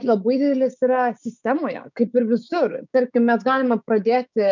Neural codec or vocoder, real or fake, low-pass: codec, 16 kHz, 6 kbps, DAC; fake; 7.2 kHz